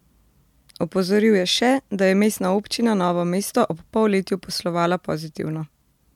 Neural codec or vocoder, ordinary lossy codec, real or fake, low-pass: none; MP3, 96 kbps; real; 19.8 kHz